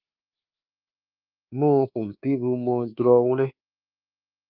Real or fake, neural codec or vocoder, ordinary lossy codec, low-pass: fake; codec, 24 kHz, 1.2 kbps, DualCodec; Opus, 24 kbps; 5.4 kHz